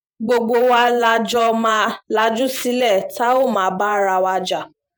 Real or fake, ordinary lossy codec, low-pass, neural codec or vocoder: fake; none; none; vocoder, 48 kHz, 128 mel bands, Vocos